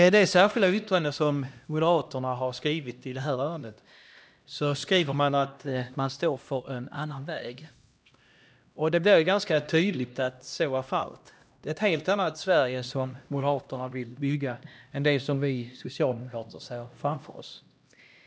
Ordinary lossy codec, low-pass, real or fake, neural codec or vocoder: none; none; fake; codec, 16 kHz, 1 kbps, X-Codec, HuBERT features, trained on LibriSpeech